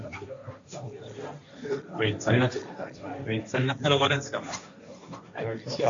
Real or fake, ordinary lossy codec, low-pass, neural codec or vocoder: fake; AAC, 64 kbps; 7.2 kHz; codec, 16 kHz, 1.1 kbps, Voila-Tokenizer